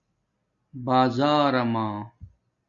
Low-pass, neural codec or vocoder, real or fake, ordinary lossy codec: 7.2 kHz; none; real; Opus, 64 kbps